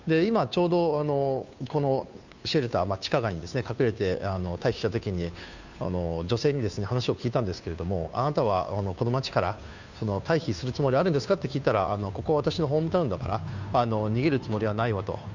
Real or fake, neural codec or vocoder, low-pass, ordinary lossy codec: fake; codec, 16 kHz, 2 kbps, FunCodec, trained on Chinese and English, 25 frames a second; 7.2 kHz; none